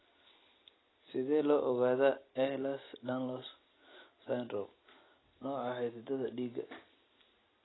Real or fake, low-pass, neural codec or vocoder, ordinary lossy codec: real; 7.2 kHz; none; AAC, 16 kbps